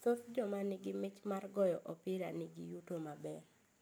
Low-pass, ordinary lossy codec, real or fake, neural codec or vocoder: none; none; real; none